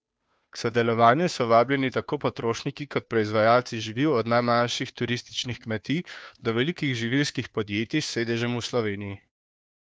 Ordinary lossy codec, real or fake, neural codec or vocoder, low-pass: none; fake; codec, 16 kHz, 2 kbps, FunCodec, trained on Chinese and English, 25 frames a second; none